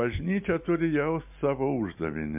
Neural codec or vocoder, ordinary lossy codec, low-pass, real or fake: none; MP3, 32 kbps; 3.6 kHz; real